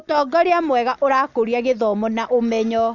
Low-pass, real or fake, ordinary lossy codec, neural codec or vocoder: 7.2 kHz; real; none; none